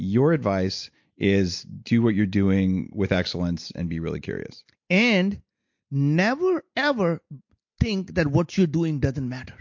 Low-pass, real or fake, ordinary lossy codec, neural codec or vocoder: 7.2 kHz; real; MP3, 48 kbps; none